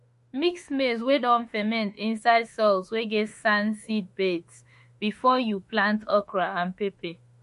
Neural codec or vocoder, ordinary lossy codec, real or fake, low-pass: autoencoder, 48 kHz, 32 numbers a frame, DAC-VAE, trained on Japanese speech; MP3, 48 kbps; fake; 14.4 kHz